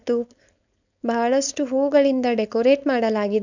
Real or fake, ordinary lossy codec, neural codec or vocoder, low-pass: fake; none; codec, 16 kHz, 4.8 kbps, FACodec; 7.2 kHz